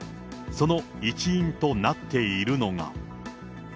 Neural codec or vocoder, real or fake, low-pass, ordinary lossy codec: none; real; none; none